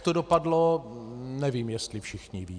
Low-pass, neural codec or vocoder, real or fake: 9.9 kHz; none; real